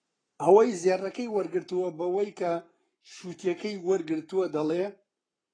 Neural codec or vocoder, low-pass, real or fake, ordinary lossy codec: codec, 44.1 kHz, 7.8 kbps, Pupu-Codec; 9.9 kHz; fake; AAC, 32 kbps